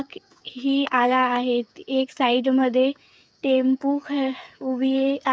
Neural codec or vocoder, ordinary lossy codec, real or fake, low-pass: codec, 16 kHz, 8 kbps, FreqCodec, smaller model; none; fake; none